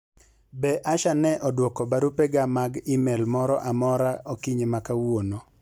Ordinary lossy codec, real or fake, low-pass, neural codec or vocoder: none; real; 19.8 kHz; none